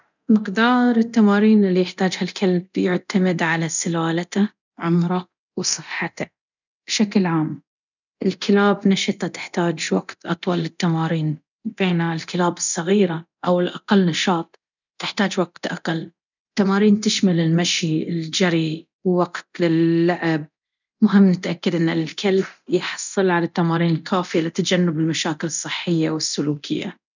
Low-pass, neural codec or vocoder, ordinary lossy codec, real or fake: 7.2 kHz; codec, 24 kHz, 0.9 kbps, DualCodec; none; fake